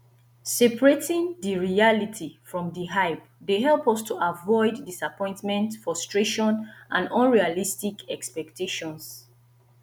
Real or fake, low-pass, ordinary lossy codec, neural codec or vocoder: real; none; none; none